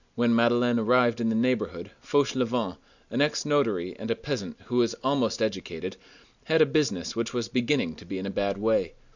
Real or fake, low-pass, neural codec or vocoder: real; 7.2 kHz; none